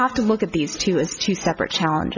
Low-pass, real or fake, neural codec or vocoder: 7.2 kHz; real; none